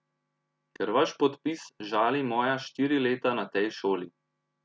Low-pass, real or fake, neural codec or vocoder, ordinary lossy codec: none; real; none; none